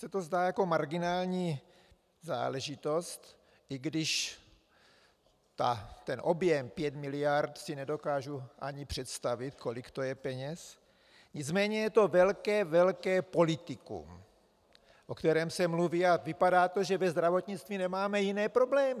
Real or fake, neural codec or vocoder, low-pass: real; none; 14.4 kHz